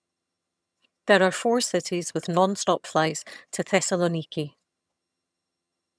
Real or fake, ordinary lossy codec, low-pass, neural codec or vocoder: fake; none; none; vocoder, 22.05 kHz, 80 mel bands, HiFi-GAN